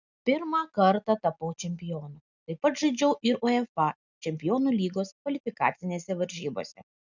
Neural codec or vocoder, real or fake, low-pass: none; real; 7.2 kHz